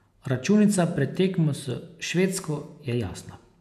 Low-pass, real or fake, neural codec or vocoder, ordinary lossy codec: 14.4 kHz; fake; vocoder, 48 kHz, 128 mel bands, Vocos; none